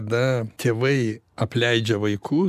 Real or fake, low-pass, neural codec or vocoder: real; 14.4 kHz; none